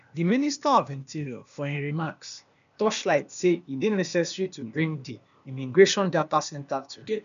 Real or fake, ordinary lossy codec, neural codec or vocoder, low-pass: fake; none; codec, 16 kHz, 0.8 kbps, ZipCodec; 7.2 kHz